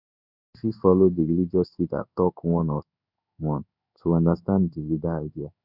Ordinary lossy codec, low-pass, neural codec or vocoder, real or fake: none; 5.4 kHz; codec, 16 kHz in and 24 kHz out, 1 kbps, XY-Tokenizer; fake